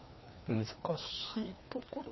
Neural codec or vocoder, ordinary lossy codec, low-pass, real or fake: codec, 16 kHz, 2 kbps, FreqCodec, larger model; MP3, 24 kbps; 7.2 kHz; fake